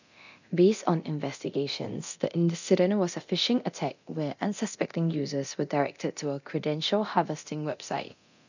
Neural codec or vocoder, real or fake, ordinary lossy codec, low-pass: codec, 24 kHz, 0.9 kbps, DualCodec; fake; none; 7.2 kHz